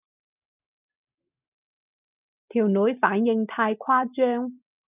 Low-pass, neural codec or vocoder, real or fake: 3.6 kHz; none; real